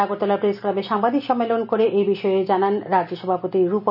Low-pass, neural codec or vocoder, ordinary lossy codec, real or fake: 5.4 kHz; none; none; real